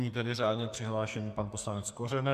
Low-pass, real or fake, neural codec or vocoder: 14.4 kHz; fake; codec, 44.1 kHz, 2.6 kbps, SNAC